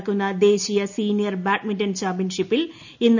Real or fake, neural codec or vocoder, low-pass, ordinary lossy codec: real; none; 7.2 kHz; none